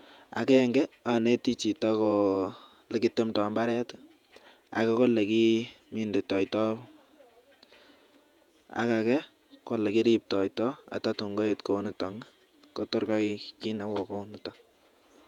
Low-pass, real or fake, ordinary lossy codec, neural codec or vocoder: 19.8 kHz; fake; none; vocoder, 48 kHz, 128 mel bands, Vocos